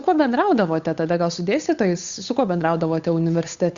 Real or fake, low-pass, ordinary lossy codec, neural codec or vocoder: fake; 7.2 kHz; Opus, 64 kbps; codec, 16 kHz, 8 kbps, FunCodec, trained on Chinese and English, 25 frames a second